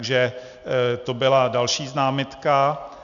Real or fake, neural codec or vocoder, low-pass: real; none; 7.2 kHz